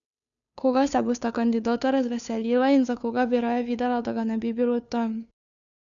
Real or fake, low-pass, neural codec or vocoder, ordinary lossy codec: fake; 7.2 kHz; codec, 16 kHz, 2 kbps, FunCodec, trained on Chinese and English, 25 frames a second; none